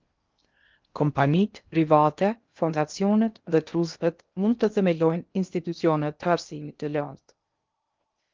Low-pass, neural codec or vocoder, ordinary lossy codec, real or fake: 7.2 kHz; codec, 16 kHz in and 24 kHz out, 0.6 kbps, FocalCodec, streaming, 2048 codes; Opus, 32 kbps; fake